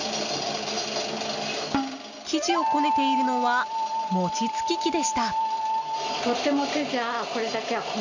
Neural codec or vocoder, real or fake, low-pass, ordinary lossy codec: none; real; 7.2 kHz; none